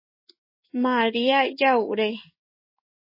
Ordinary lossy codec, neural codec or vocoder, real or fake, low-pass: MP3, 24 kbps; codec, 16 kHz in and 24 kHz out, 1 kbps, XY-Tokenizer; fake; 5.4 kHz